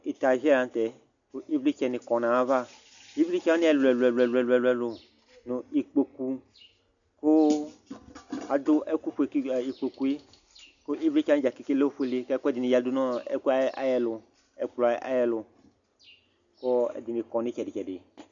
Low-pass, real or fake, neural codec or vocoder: 7.2 kHz; real; none